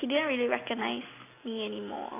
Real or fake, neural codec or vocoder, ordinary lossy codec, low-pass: real; none; AAC, 24 kbps; 3.6 kHz